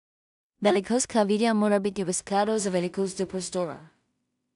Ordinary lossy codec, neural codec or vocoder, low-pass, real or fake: none; codec, 16 kHz in and 24 kHz out, 0.4 kbps, LongCat-Audio-Codec, two codebook decoder; 10.8 kHz; fake